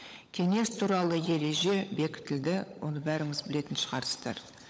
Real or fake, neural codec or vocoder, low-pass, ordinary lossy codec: fake; codec, 16 kHz, 16 kbps, FunCodec, trained on LibriTTS, 50 frames a second; none; none